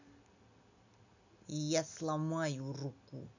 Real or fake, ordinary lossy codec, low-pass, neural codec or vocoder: real; none; 7.2 kHz; none